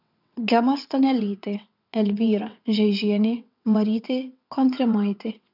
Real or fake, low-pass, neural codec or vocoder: fake; 5.4 kHz; vocoder, 22.05 kHz, 80 mel bands, WaveNeXt